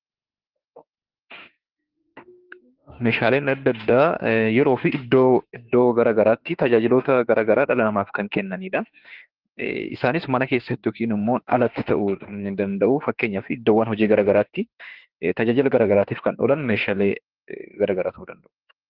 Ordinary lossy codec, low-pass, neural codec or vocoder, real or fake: Opus, 16 kbps; 5.4 kHz; autoencoder, 48 kHz, 32 numbers a frame, DAC-VAE, trained on Japanese speech; fake